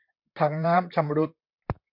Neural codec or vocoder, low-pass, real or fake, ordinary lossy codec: vocoder, 44.1 kHz, 128 mel bands, Pupu-Vocoder; 5.4 kHz; fake; MP3, 48 kbps